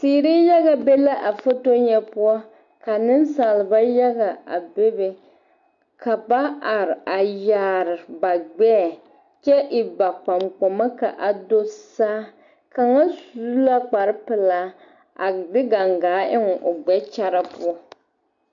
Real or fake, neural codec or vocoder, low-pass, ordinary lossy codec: real; none; 7.2 kHz; AAC, 64 kbps